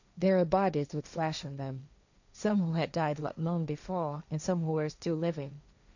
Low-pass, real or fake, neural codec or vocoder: 7.2 kHz; fake; codec, 16 kHz, 1.1 kbps, Voila-Tokenizer